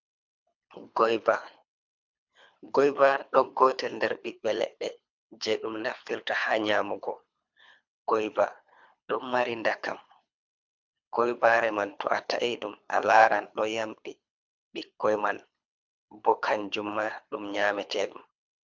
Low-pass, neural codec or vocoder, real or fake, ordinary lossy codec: 7.2 kHz; codec, 24 kHz, 3 kbps, HILCodec; fake; MP3, 64 kbps